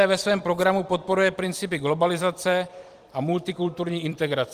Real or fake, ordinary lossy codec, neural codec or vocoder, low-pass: fake; Opus, 24 kbps; vocoder, 44.1 kHz, 128 mel bands every 256 samples, BigVGAN v2; 14.4 kHz